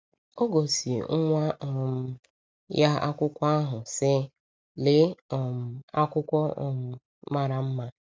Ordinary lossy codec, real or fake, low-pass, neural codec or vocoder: none; real; none; none